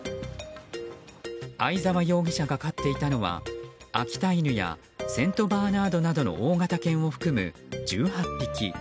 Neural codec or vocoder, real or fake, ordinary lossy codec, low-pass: none; real; none; none